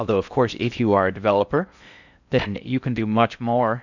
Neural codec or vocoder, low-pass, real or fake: codec, 16 kHz in and 24 kHz out, 0.6 kbps, FocalCodec, streaming, 4096 codes; 7.2 kHz; fake